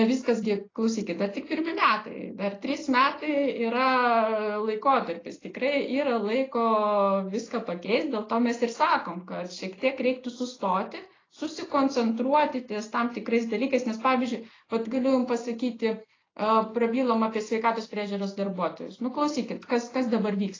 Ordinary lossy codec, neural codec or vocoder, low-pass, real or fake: AAC, 32 kbps; none; 7.2 kHz; real